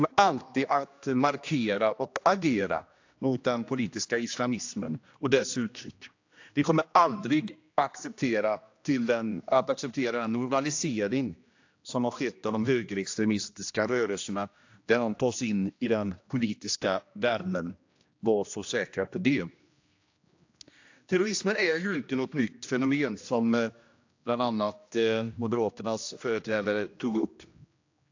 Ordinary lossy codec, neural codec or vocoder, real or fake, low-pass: AAC, 48 kbps; codec, 16 kHz, 1 kbps, X-Codec, HuBERT features, trained on general audio; fake; 7.2 kHz